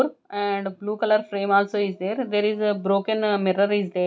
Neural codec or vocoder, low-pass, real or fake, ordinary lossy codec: none; none; real; none